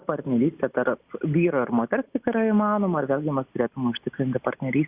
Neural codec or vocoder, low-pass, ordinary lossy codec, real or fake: none; 3.6 kHz; Opus, 64 kbps; real